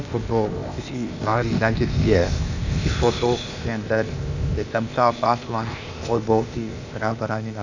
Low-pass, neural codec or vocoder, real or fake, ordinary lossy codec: 7.2 kHz; codec, 16 kHz, 0.8 kbps, ZipCodec; fake; none